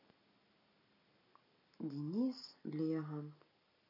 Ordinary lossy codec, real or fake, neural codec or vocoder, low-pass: none; real; none; 5.4 kHz